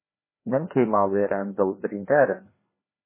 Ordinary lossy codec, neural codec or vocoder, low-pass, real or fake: MP3, 16 kbps; codec, 16 kHz, 2 kbps, FreqCodec, larger model; 3.6 kHz; fake